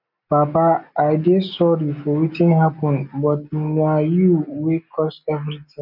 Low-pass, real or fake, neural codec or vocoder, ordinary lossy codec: 5.4 kHz; real; none; none